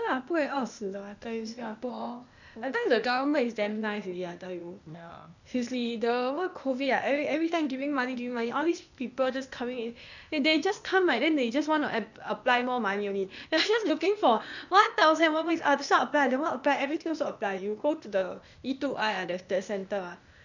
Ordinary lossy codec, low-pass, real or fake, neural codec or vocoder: none; 7.2 kHz; fake; codec, 16 kHz, 0.8 kbps, ZipCodec